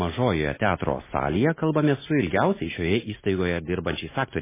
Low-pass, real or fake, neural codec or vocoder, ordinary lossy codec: 3.6 kHz; real; none; MP3, 16 kbps